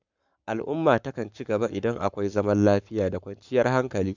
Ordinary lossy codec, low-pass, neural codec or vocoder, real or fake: none; 7.2 kHz; codec, 44.1 kHz, 7.8 kbps, Pupu-Codec; fake